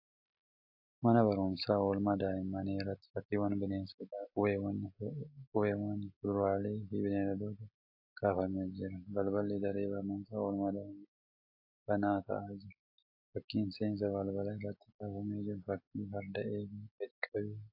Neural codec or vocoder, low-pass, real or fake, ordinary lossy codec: none; 5.4 kHz; real; AAC, 48 kbps